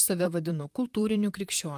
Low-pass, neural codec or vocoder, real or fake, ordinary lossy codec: 14.4 kHz; vocoder, 44.1 kHz, 128 mel bands, Pupu-Vocoder; fake; Opus, 32 kbps